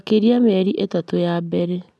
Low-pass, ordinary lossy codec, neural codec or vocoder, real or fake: none; none; none; real